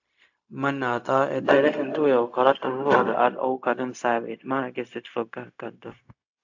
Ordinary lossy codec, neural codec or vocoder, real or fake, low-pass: AAC, 48 kbps; codec, 16 kHz, 0.4 kbps, LongCat-Audio-Codec; fake; 7.2 kHz